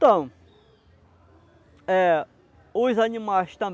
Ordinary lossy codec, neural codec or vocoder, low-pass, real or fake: none; none; none; real